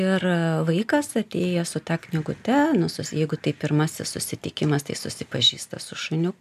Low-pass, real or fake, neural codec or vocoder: 14.4 kHz; real; none